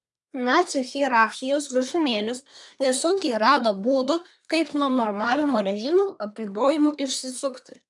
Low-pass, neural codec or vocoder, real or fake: 10.8 kHz; codec, 24 kHz, 1 kbps, SNAC; fake